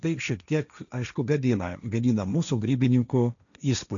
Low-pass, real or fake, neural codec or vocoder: 7.2 kHz; fake; codec, 16 kHz, 1.1 kbps, Voila-Tokenizer